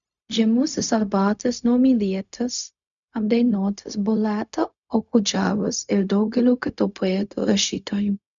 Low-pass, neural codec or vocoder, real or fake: 7.2 kHz; codec, 16 kHz, 0.4 kbps, LongCat-Audio-Codec; fake